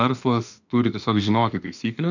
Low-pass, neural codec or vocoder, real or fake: 7.2 kHz; autoencoder, 48 kHz, 32 numbers a frame, DAC-VAE, trained on Japanese speech; fake